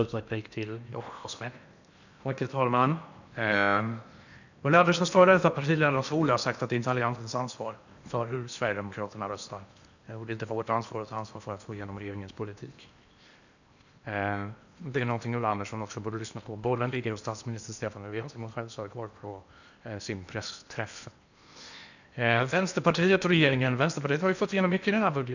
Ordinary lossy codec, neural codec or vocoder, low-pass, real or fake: none; codec, 16 kHz in and 24 kHz out, 0.8 kbps, FocalCodec, streaming, 65536 codes; 7.2 kHz; fake